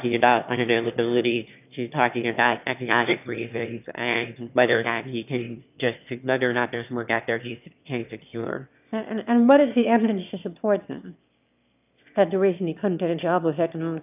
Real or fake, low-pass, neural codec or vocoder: fake; 3.6 kHz; autoencoder, 22.05 kHz, a latent of 192 numbers a frame, VITS, trained on one speaker